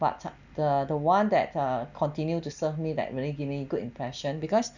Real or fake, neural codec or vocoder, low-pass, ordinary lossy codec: real; none; 7.2 kHz; none